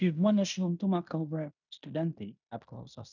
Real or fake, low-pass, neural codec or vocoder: fake; 7.2 kHz; codec, 16 kHz in and 24 kHz out, 0.9 kbps, LongCat-Audio-Codec, fine tuned four codebook decoder